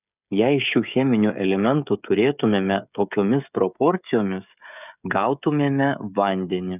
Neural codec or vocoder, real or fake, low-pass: codec, 16 kHz, 16 kbps, FreqCodec, smaller model; fake; 3.6 kHz